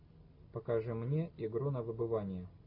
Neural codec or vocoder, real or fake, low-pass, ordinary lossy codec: none; real; 5.4 kHz; AAC, 48 kbps